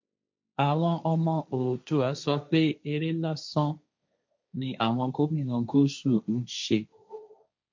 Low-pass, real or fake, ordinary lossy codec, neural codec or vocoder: 7.2 kHz; fake; MP3, 48 kbps; codec, 16 kHz, 1.1 kbps, Voila-Tokenizer